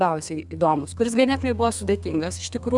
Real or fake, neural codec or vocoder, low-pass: fake; codec, 32 kHz, 1.9 kbps, SNAC; 10.8 kHz